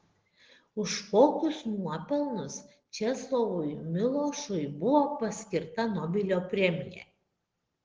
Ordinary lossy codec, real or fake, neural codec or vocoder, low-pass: Opus, 16 kbps; real; none; 7.2 kHz